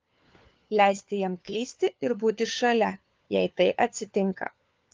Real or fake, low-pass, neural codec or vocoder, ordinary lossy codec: fake; 7.2 kHz; codec, 16 kHz, 4 kbps, FunCodec, trained on LibriTTS, 50 frames a second; Opus, 32 kbps